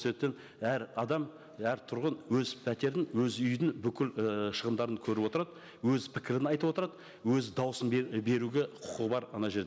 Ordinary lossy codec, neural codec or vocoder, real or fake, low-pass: none; none; real; none